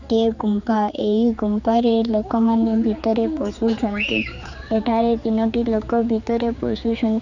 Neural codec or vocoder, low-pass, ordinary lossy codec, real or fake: codec, 16 kHz, 4 kbps, X-Codec, HuBERT features, trained on general audio; 7.2 kHz; none; fake